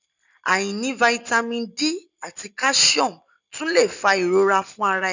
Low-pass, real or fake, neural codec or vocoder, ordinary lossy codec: 7.2 kHz; real; none; none